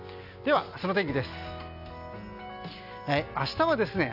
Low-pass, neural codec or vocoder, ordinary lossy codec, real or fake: 5.4 kHz; none; none; real